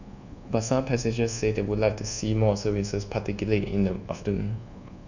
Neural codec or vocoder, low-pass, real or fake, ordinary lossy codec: codec, 24 kHz, 1.2 kbps, DualCodec; 7.2 kHz; fake; none